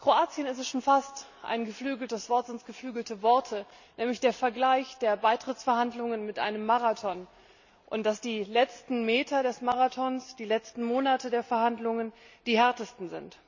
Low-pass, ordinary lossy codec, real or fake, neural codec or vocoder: 7.2 kHz; none; real; none